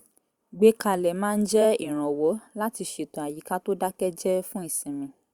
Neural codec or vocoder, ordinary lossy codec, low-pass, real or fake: vocoder, 44.1 kHz, 128 mel bands every 512 samples, BigVGAN v2; Opus, 64 kbps; 19.8 kHz; fake